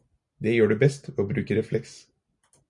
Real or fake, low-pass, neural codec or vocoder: real; 10.8 kHz; none